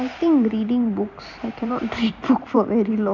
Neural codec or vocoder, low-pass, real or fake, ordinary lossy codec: vocoder, 44.1 kHz, 128 mel bands every 256 samples, BigVGAN v2; 7.2 kHz; fake; none